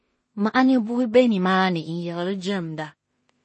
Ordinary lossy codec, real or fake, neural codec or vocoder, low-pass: MP3, 32 kbps; fake; codec, 16 kHz in and 24 kHz out, 0.4 kbps, LongCat-Audio-Codec, two codebook decoder; 10.8 kHz